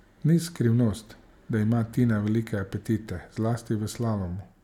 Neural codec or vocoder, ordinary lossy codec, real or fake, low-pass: none; none; real; 19.8 kHz